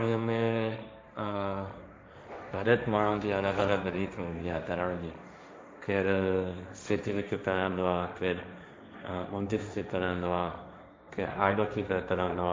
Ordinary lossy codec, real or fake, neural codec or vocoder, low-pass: none; fake; codec, 16 kHz, 1.1 kbps, Voila-Tokenizer; none